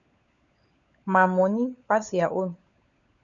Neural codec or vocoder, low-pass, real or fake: codec, 16 kHz, 16 kbps, FunCodec, trained on LibriTTS, 50 frames a second; 7.2 kHz; fake